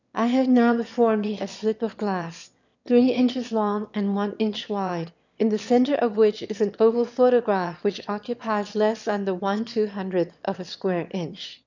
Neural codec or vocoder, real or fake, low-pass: autoencoder, 22.05 kHz, a latent of 192 numbers a frame, VITS, trained on one speaker; fake; 7.2 kHz